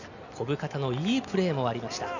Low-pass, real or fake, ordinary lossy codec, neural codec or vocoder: 7.2 kHz; real; none; none